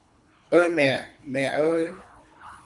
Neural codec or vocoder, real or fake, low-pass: codec, 24 kHz, 3 kbps, HILCodec; fake; 10.8 kHz